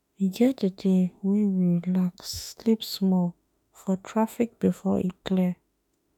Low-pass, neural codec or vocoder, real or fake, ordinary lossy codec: 19.8 kHz; autoencoder, 48 kHz, 32 numbers a frame, DAC-VAE, trained on Japanese speech; fake; none